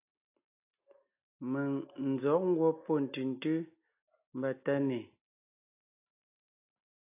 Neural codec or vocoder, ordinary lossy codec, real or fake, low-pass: none; AAC, 24 kbps; real; 3.6 kHz